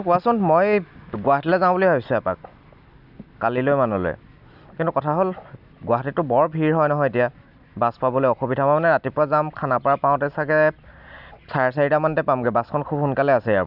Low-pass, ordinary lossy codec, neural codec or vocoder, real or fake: 5.4 kHz; none; none; real